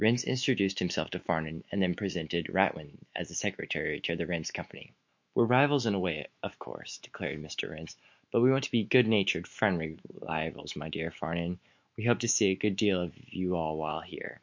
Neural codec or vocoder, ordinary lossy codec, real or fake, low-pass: autoencoder, 48 kHz, 128 numbers a frame, DAC-VAE, trained on Japanese speech; MP3, 48 kbps; fake; 7.2 kHz